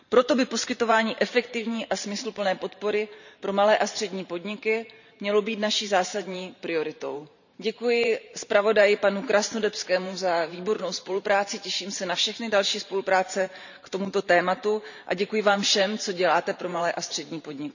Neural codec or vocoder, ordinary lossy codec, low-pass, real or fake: vocoder, 44.1 kHz, 128 mel bands every 512 samples, BigVGAN v2; none; 7.2 kHz; fake